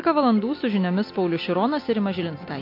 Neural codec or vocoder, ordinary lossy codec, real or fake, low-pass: none; MP3, 32 kbps; real; 5.4 kHz